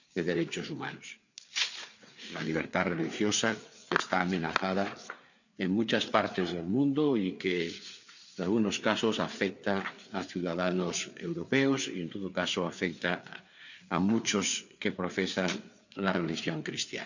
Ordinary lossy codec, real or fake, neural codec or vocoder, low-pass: none; fake; codec, 16 kHz, 4 kbps, FreqCodec, larger model; 7.2 kHz